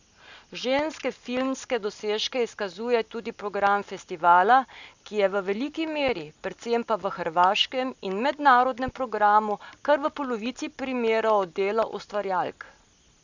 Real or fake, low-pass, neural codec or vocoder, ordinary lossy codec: real; 7.2 kHz; none; none